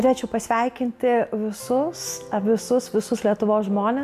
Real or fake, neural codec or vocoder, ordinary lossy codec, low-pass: real; none; AAC, 96 kbps; 14.4 kHz